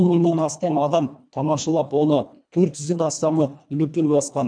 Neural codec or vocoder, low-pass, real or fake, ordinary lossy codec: codec, 24 kHz, 1.5 kbps, HILCodec; 9.9 kHz; fake; none